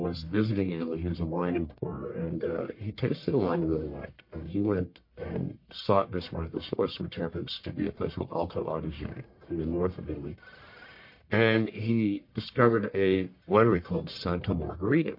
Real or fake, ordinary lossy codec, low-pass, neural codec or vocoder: fake; MP3, 32 kbps; 5.4 kHz; codec, 44.1 kHz, 1.7 kbps, Pupu-Codec